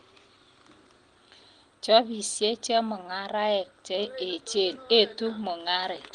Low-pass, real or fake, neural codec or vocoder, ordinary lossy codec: 9.9 kHz; real; none; Opus, 24 kbps